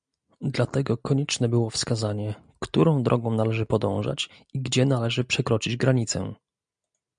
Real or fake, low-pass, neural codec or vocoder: real; 9.9 kHz; none